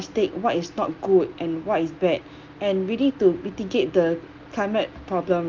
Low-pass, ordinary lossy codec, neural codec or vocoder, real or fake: 7.2 kHz; Opus, 32 kbps; none; real